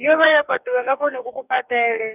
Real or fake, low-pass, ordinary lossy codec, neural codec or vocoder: fake; 3.6 kHz; none; codec, 44.1 kHz, 2.6 kbps, DAC